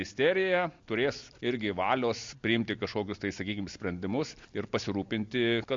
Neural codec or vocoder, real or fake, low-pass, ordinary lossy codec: none; real; 7.2 kHz; MP3, 48 kbps